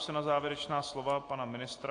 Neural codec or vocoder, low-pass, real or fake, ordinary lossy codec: none; 9.9 kHz; real; AAC, 48 kbps